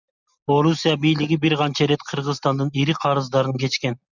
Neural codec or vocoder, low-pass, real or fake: none; 7.2 kHz; real